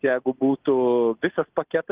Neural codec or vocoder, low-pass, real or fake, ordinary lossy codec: none; 3.6 kHz; real; Opus, 32 kbps